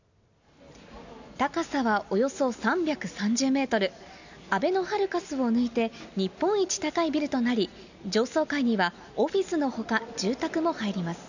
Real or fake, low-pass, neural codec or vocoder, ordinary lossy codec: real; 7.2 kHz; none; none